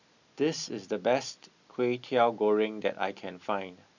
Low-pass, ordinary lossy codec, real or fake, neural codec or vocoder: 7.2 kHz; none; real; none